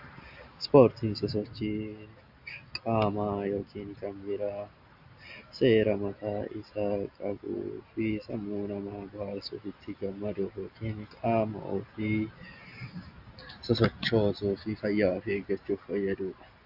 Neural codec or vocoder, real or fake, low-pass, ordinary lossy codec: none; real; 5.4 kHz; AAC, 48 kbps